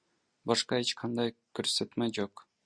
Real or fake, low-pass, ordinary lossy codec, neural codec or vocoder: real; 9.9 kHz; Opus, 64 kbps; none